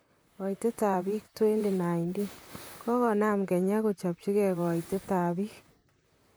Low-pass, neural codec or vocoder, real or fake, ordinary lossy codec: none; vocoder, 44.1 kHz, 128 mel bands, Pupu-Vocoder; fake; none